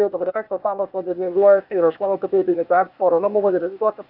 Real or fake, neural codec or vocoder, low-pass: fake; codec, 16 kHz, 0.8 kbps, ZipCodec; 5.4 kHz